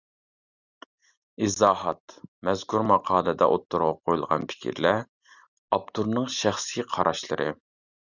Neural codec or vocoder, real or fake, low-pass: none; real; 7.2 kHz